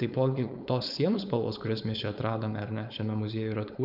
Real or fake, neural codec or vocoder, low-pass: fake; codec, 16 kHz, 4.8 kbps, FACodec; 5.4 kHz